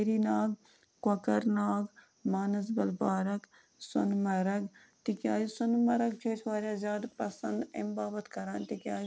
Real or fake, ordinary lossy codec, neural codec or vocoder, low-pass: real; none; none; none